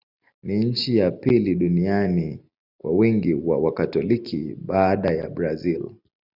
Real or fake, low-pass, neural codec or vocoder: real; 5.4 kHz; none